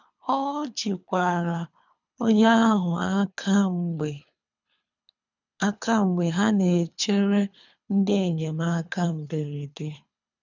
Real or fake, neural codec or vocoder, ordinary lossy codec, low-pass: fake; codec, 24 kHz, 3 kbps, HILCodec; none; 7.2 kHz